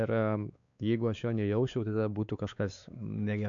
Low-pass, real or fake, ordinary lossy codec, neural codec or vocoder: 7.2 kHz; fake; MP3, 96 kbps; codec, 16 kHz, 4 kbps, X-Codec, WavLM features, trained on Multilingual LibriSpeech